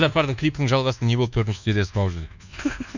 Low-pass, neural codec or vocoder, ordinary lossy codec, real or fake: 7.2 kHz; codec, 24 kHz, 1.2 kbps, DualCodec; none; fake